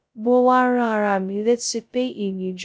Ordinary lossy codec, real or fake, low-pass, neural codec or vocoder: none; fake; none; codec, 16 kHz, 0.2 kbps, FocalCodec